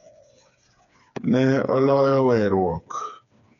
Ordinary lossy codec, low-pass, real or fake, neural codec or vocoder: none; 7.2 kHz; fake; codec, 16 kHz, 4 kbps, FreqCodec, smaller model